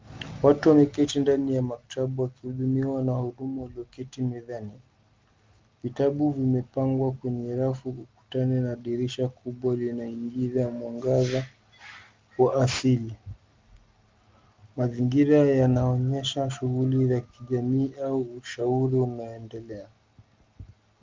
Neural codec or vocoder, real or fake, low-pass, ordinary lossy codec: none; real; 7.2 kHz; Opus, 32 kbps